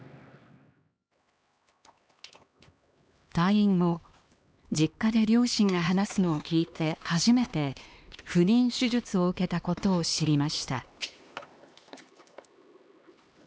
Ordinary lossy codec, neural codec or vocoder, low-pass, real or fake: none; codec, 16 kHz, 2 kbps, X-Codec, HuBERT features, trained on LibriSpeech; none; fake